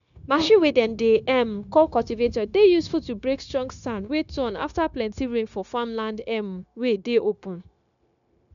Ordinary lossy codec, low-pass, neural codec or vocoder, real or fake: none; 7.2 kHz; codec, 16 kHz, 0.9 kbps, LongCat-Audio-Codec; fake